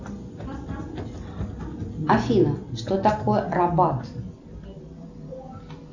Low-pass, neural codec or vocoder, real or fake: 7.2 kHz; none; real